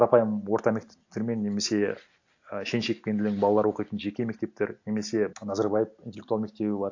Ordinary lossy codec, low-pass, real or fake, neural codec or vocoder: AAC, 48 kbps; 7.2 kHz; real; none